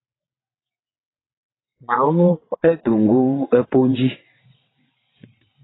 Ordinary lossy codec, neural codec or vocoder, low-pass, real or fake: AAC, 16 kbps; vocoder, 22.05 kHz, 80 mel bands, WaveNeXt; 7.2 kHz; fake